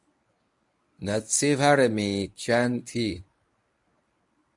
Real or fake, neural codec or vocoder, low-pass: fake; codec, 24 kHz, 0.9 kbps, WavTokenizer, medium speech release version 1; 10.8 kHz